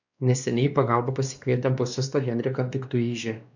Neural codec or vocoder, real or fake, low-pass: codec, 16 kHz, 2 kbps, X-Codec, WavLM features, trained on Multilingual LibriSpeech; fake; 7.2 kHz